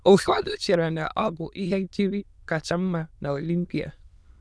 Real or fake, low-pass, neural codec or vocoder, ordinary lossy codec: fake; none; autoencoder, 22.05 kHz, a latent of 192 numbers a frame, VITS, trained on many speakers; none